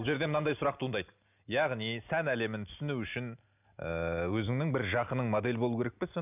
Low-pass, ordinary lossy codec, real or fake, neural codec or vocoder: 3.6 kHz; none; real; none